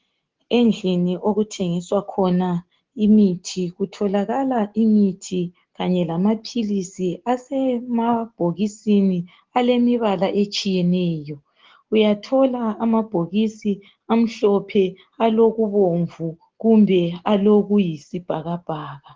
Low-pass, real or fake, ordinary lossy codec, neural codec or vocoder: 7.2 kHz; real; Opus, 16 kbps; none